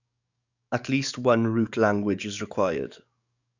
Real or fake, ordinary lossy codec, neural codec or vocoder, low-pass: fake; none; codec, 44.1 kHz, 7.8 kbps, DAC; 7.2 kHz